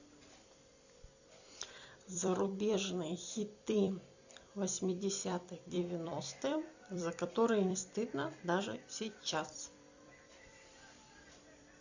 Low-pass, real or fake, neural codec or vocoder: 7.2 kHz; fake; vocoder, 44.1 kHz, 128 mel bands every 256 samples, BigVGAN v2